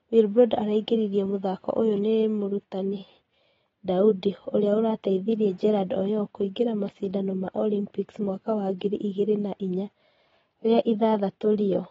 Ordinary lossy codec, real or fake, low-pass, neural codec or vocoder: AAC, 24 kbps; real; 7.2 kHz; none